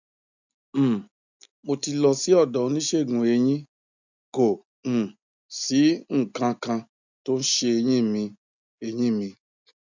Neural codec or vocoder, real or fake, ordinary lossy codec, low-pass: none; real; none; 7.2 kHz